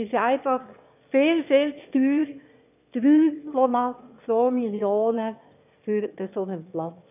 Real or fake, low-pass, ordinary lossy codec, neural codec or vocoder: fake; 3.6 kHz; none; autoencoder, 22.05 kHz, a latent of 192 numbers a frame, VITS, trained on one speaker